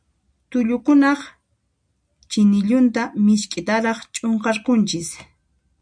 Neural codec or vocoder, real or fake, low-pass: none; real; 9.9 kHz